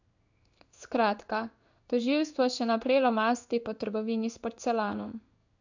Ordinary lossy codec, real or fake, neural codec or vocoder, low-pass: none; fake; codec, 16 kHz in and 24 kHz out, 1 kbps, XY-Tokenizer; 7.2 kHz